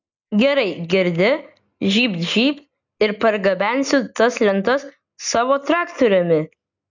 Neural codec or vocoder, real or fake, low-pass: none; real; 7.2 kHz